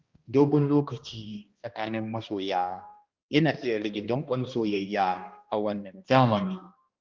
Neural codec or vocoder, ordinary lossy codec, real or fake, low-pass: codec, 16 kHz, 1 kbps, X-Codec, HuBERT features, trained on balanced general audio; Opus, 32 kbps; fake; 7.2 kHz